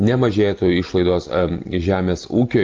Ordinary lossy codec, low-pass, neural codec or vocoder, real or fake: Opus, 24 kbps; 7.2 kHz; none; real